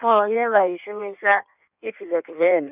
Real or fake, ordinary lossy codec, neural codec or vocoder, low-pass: fake; none; codec, 16 kHz in and 24 kHz out, 1.1 kbps, FireRedTTS-2 codec; 3.6 kHz